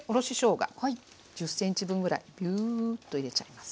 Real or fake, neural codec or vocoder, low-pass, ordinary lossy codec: real; none; none; none